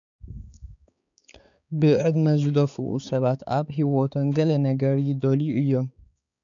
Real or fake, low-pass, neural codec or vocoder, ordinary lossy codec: fake; 7.2 kHz; codec, 16 kHz, 4 kbps, X-Codec, HuBERT features, trained on balanced general audio; MP3, 96 kbps